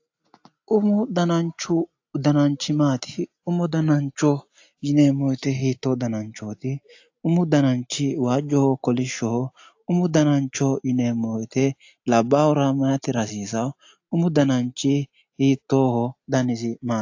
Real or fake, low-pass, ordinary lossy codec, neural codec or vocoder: real; 7.2 kHz; AAC, 48 kbps; none